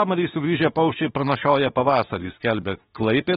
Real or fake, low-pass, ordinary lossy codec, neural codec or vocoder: fake; 19.8 kHz; AAC, 16 kbps; autoencoder, 48 kHz, 32 numbers a frame, DAC-VAE, trained on Japanese speech